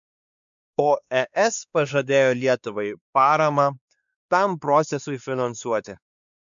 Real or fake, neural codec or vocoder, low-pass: fake; codec, 16 kHz, 2 kbps, X-Codec, WavLM features, trained on Multilingual LibriSpeech; 7.2 kHz